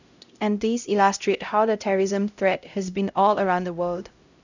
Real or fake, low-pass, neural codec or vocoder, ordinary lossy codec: fake; 7.2 kHz; codec, 16 kHz, 0.5 kbps, X-Codec, HuBERT features, trained on LibriSpeech; none